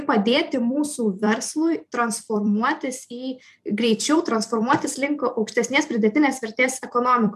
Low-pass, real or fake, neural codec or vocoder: 14.4 kHz; fake; vocoder, 44.1 kHz, 128 mel bands every 256 samples, BigVGAN v2